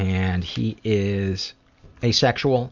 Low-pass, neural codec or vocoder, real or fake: 7.2 kHz; none; real